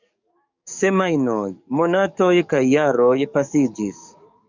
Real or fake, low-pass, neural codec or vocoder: fake; 7.2 kHz; codec, 44.1 kHz, 7.8 kbps, DAC